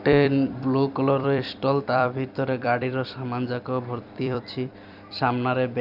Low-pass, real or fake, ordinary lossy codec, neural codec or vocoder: 5.4 kHz; fake; none; vocoder, 44.1 kHz, 128 mel bands every 256 samples, BigVGAN v2